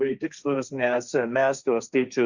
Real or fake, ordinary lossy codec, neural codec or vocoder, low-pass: fake; Opus, 64 kbps; codec, 16 kHz, 1.1 kbps, Voila-Tokenizer; 7.2 kHz